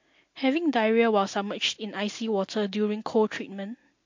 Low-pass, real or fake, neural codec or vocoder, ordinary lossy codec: 7.2 kHz; real; none; MP3, 48 kbps